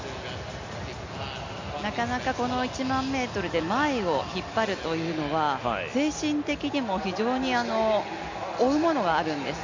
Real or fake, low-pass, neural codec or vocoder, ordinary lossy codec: real; 7.2 kHz; none; MP3, 64 kbps